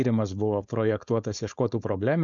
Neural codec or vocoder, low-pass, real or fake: codec, 16 kHz, 4.8 kbps, FACodec; 7.2 kHz; fake